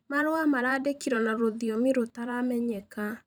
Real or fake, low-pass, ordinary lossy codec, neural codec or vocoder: fake; none; none; vocoder, 44.1 kHz, 128 mel bands every 512 samples, BigVGAN v2